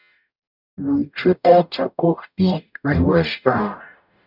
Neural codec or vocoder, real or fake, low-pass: codec, 44.1 kHz, 0.9 kbps, DAC; fake; 5.4 kHz